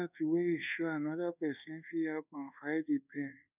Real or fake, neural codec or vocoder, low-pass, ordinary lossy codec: fake; codec, 24 kHz, 1.2 kbps, DualCodec; 3.6 kHz; none